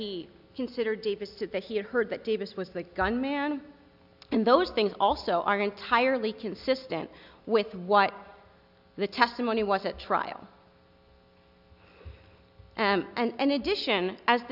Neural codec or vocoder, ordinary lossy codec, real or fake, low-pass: none; AAC, 48 kbps; real; 5.4 kHz